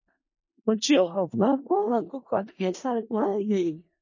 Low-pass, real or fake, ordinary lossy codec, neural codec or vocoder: 7.2 kHz; fake; MP3, 32 kbps; codec, 16 kHz in and 24 kHz out, 0.4 kbps, LongCat-Audio-Codec, four codebook decoder